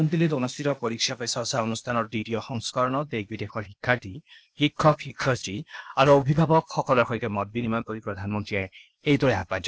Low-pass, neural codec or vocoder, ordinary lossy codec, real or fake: none; codec, 16 kHz, 0.8 kbps, ZipCodec; none; fake